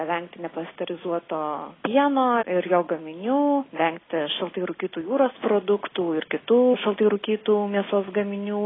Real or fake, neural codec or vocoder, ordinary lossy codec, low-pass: real; none; AAC, 16 kbps; 7.2 kHz